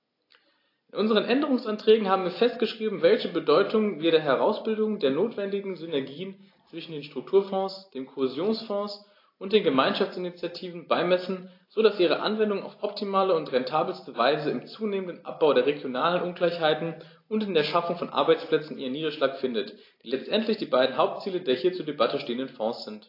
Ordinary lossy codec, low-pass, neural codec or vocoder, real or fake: AAC, 32 kbps; 5.4 kHz; none; real